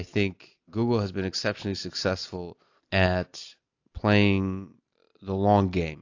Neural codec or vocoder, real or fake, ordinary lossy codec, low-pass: none; real; AAC, 48 kbps; 7.2 kHz